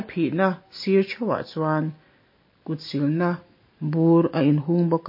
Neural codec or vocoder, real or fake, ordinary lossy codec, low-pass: none; real; MP3, 24 kbps; 5.4 kHz